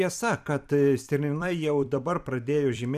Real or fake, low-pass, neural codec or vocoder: fake; 14.4 kHz; vocoder, 44.1 kHz, 128 mel bands every 256 samples, BigVGAN v2